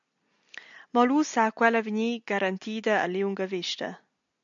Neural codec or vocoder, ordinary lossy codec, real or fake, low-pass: none; MP3, 64 kbps; real; 7.2 kHz